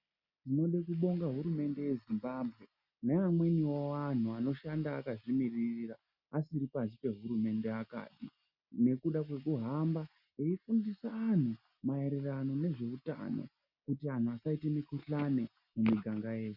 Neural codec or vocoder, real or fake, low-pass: none; real; 5.4 kHz